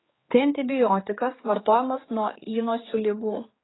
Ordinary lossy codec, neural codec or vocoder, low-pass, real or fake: AAC, 16 kbps; codec, 16 kHz, 4 kbps, X-Codec, HuBERT features, trained on general audio; 7.2 kHz; fake